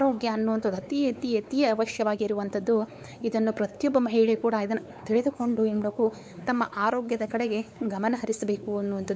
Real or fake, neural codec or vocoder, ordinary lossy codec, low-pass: fake; codec, 16 kHz, 4 kbps, X-Codec, WavLM features, trained on Multilingual LibriSpeech; none; none